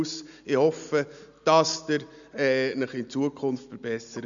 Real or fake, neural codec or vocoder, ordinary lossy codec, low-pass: real; none; none; 7.2 kHz